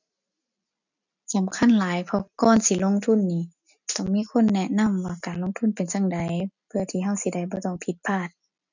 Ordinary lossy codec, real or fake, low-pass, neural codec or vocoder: none; real; 7.2 kHz; none